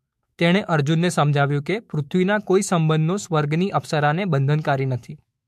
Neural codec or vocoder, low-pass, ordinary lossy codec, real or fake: autoencoder, 48 kHz, 128 numbers a frame, DAC-VAE, trained on Japanese speech; 14.4 kHz; MP3, 64 kbps; fake